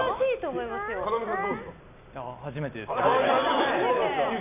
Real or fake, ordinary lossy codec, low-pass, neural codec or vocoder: real; none; 3.6 kHz; none